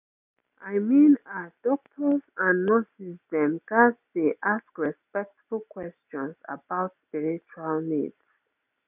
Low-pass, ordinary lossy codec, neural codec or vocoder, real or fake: 3.6 kHz; none; none; real